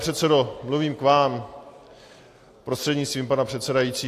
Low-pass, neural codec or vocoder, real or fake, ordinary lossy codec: 14.4 kHz; none; real; AAC, 64 kbps